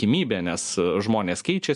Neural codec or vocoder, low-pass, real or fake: none; 10.8 kHz; real